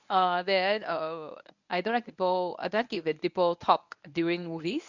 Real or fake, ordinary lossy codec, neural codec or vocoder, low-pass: fake; none; codec, 24 kHz, 0.9 kbps, WavTokenizer, medium speech release version 1; 7.2 kHz